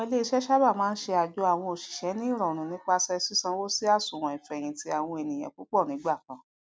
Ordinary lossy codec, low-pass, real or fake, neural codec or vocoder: none; none; real; none